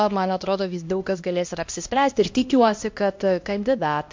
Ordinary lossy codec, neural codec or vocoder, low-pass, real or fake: MP3, 48 kbps; codec, 16 kHz, 1 kbps, X-Codec, HuBERT features, trained on LibriSpeech; 7.2 kHz; fake